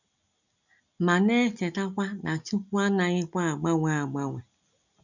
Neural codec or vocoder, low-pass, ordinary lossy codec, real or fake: none; 7.2 kHz; none; real